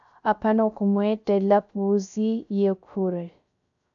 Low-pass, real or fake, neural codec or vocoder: 7.2 kHz; fake; codec, 16 kHz, 0.3 kbps, FocalCodec